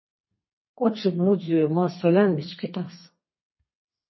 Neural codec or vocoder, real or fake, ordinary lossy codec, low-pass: codec, 32 kHz, 1.9 kbps, SNAC; fake; MP3, 24 kbps; 7.2 kHz